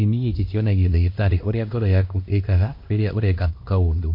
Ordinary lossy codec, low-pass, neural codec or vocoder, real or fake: MP3, 32 kbps; 5.4 kHz; codec, 16 kHz, 1 kbps, X-Codec, HuBERT features, trained on LibriSpeech; fake